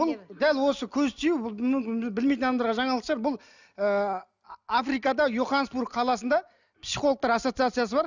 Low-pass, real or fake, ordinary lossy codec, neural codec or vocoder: 7.2 kHz; real; none; none